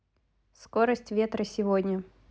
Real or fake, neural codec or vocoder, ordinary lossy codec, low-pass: real; none; none; none